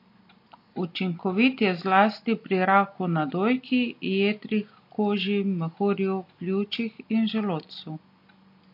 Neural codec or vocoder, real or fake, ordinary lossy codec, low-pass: none; real; MP3, 32 kbps; 5.4 kHz